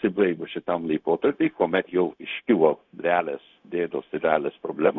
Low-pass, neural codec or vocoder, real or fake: 7.2 kHz; codec, 16 kHz, 0.4 kbps, LongCat-Audio-Codec; fake